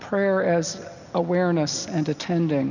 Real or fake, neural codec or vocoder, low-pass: real; none; 7.2 kHz